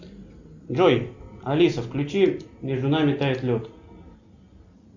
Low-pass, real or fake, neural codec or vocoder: 7.2 kHz; real; none